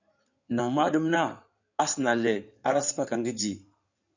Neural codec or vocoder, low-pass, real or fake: codec, 16 kHz in and 24 kHz out, 2.2 kbps, FireRedTTS-2 codec; 7.2 kHz; fake